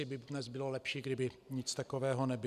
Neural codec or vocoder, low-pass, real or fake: none; 14.4 kHz; real